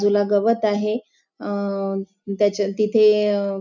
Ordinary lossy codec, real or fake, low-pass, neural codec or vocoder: none; real; 7.2 kHz; none